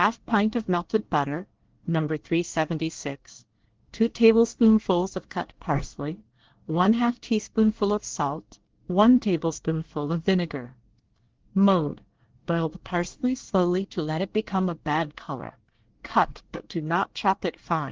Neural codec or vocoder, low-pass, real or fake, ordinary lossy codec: codec, 24 kHz, 1 kbps, SNAC; 7.2 kHz; fake; Opus, 16 kbps